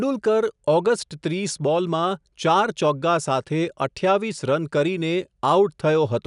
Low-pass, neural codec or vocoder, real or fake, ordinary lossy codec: 10.8 kHz; none; real; none